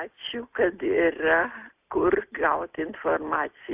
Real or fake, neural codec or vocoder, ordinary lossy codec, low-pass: real; none; AAC, 32 kbps; 3.6 kHz